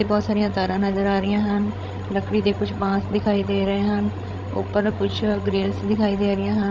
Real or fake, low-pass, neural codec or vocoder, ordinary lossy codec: fake; none; codec, 16 kHz, 16 kbps, FreqCodec, larger model; none